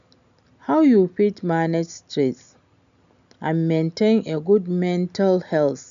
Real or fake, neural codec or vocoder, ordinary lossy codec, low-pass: real; none; none; 7.2 kHz